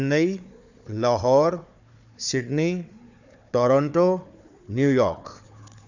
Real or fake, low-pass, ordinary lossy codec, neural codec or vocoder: fake; 7.2 kHz; none; codec, 16 kHz, 4 kbps, FunCodec, trained on Chinese and English, 50 frames a second